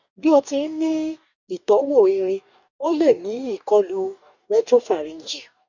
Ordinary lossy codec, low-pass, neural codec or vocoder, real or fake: none; 7.2 kHz; codec, 44.1 kHz, 2.6 kbps, DAC; fake